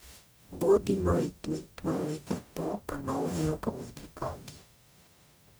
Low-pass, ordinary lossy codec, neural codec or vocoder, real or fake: none; none; codec, 44.1 kHz, 0.9 kbps, DAC; fake